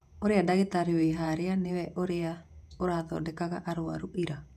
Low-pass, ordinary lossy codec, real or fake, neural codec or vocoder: 14.4 kHz; none; fake; vocoder, 48 kHz, 128 mel bands, Vocos